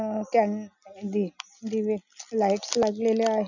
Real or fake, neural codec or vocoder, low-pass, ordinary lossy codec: real; none; 7.2 kHz; none